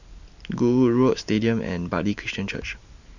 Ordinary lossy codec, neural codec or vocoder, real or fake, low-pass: none; none; real; 7.2 kHz